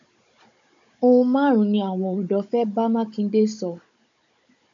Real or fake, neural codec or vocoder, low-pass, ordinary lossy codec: fake; codec, 16 kHz, 16 kbps, FunCodec, trained on Chinese and English, 50 frames a second; 7.2 kHz; MP3, 64 kbps